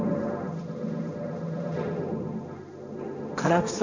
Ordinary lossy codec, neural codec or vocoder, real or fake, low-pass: none; codec, 16 kHz, 1.1 kbps, Voila-Tokenizer; fake; 7.2 kHz